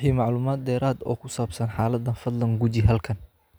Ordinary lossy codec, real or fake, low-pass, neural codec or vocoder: none; real; none; none